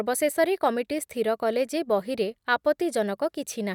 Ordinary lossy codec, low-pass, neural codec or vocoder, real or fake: none; 19.8 kHz; none; real